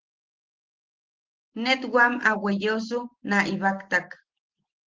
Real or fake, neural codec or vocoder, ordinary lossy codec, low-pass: real; none; Opus, 16 kbps; 7.2 kHz